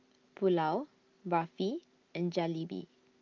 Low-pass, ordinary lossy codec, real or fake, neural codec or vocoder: 7.2 kHz; Opus, 24 kbps; real; none